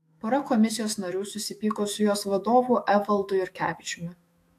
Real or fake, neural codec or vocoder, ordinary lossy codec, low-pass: fake; autoencoder, 48 kHz, 128 numbers a frame, DAC-VAE, trained on Japanese speech; AAC, 64 kbps; 14.4 kHz